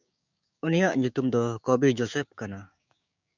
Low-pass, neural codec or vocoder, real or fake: 7.2 kHz; codec, 16 kHz, 6 kbps, DAC; fake